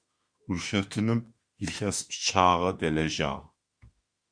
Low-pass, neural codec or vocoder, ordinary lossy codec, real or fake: 9.9 kHz; autoencoder, 48 kHz, 32 numbers a frame, DAC-VAE, trained on Japanese speech; Opus, 64 kbps; fake